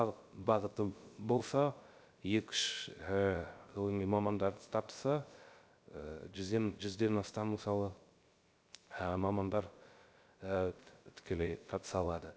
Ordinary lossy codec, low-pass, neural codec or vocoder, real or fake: none; none; codec, 16 kHz, 0.3 kbps, FocalCodec; fake